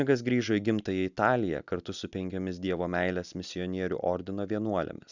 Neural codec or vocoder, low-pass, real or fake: none; 7.2 kHz; real